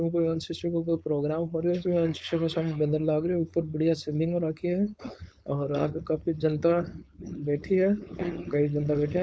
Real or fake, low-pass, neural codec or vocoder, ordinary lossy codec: fake; none; codec, 16 kHz, 4.8 kbps, FACodec; none